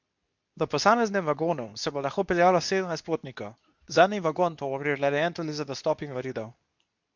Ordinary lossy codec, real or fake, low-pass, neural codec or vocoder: MP3, 64 kbps; fake; 7.2 kHz; codec, 24 kHz, 0.9 kbps, WavTokenizer, medium speech release version 2